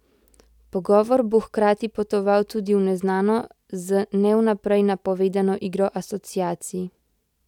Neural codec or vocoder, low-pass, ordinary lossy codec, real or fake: none; 19.8 kHz; none; real